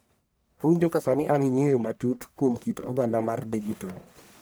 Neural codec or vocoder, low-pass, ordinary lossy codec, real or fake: codec, 44.1 kHz, 1.7 kbps, Pupu-Codec; none; none; fake